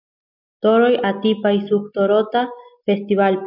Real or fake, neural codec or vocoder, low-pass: real; none; 5.4 kHz